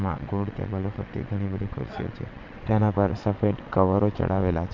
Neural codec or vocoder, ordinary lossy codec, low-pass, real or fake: vocoder, 22.05 kHz, 80 mel bands, WaveNeXt; MP3, 64 kbps; 7.2 kHz; fake